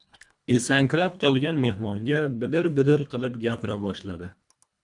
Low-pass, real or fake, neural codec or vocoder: 10.8 kHz; fake; codec, 24 kHz, 1.5 kbps, HILCodec